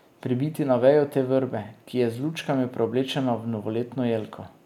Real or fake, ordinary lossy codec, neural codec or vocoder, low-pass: real; none; none; 19.8 kHz